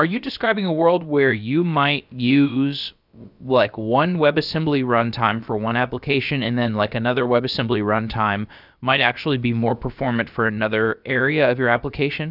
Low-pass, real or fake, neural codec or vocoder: 5.4 kHz; fake; codec, 16 kHz, about 1 kbps, DyCAST, with the encoder's durations